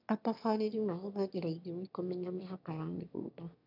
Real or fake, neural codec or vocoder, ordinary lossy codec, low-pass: fake; autoencoder, 22.05 kHz, a latent of 192 numbers a frame, VITS, trained on one speaker; none; 5.4 kHz